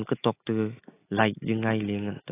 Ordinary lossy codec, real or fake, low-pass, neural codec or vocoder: AAC, 16 kbps; real; 3.6 kHz; none